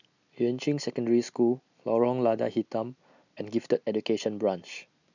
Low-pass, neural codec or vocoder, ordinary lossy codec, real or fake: 7.2 kHz; none; none; real